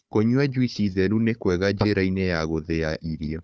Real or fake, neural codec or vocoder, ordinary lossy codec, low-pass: fake; codec, 16 kHz, 4 kbps, FunCodec, trained on Chinese and English, 50 frames a second; none; none